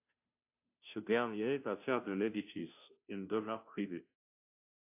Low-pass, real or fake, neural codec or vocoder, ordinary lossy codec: 3.6 kHz; fake; codec, 16 kHz, 0.5 kbps, FunCodec, trained on Chinese and English, 25 frames a second; AAC, 32 kbps